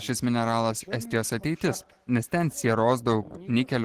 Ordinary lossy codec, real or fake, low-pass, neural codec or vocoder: Opus, 16 kbps; real; 14.4 kHz; none